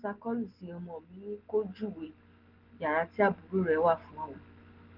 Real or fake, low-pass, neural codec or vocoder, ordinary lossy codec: real; 5.4 kHz; none; Opus, 16 kbps